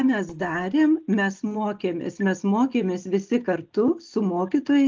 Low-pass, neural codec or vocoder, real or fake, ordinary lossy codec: 7.2 kHz; none; real; Opus, 24 kbps